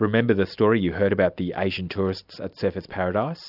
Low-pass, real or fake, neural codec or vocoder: 5.4 kHz; real; none